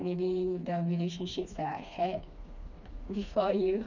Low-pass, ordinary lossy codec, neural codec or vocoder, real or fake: 7.2 kHz; none; codec, 16 kHz, 2 kbps, FreqCodec, smaller model; fake